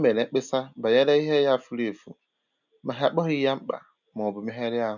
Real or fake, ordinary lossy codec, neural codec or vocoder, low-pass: real; none; none; 7.2 kHz